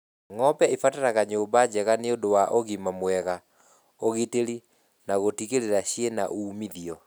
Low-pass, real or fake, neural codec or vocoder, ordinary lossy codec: none; real; none; none